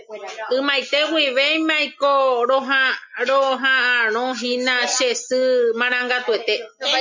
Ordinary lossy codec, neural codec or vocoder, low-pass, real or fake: MP3, 64 kbps; none; 7.2 kHz; real